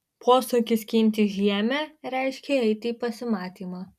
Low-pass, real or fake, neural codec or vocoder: 14.4 kHz; real; none